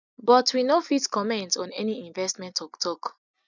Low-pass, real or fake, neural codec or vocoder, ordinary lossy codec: 7.2 kHz; real; none; none